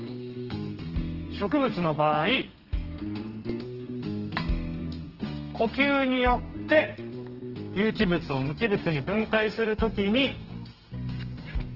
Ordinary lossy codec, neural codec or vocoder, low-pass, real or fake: Opus, 16 kbps; codec, 32 kHz, 1.9 kbps, SNAC; 5.4 kHz; fake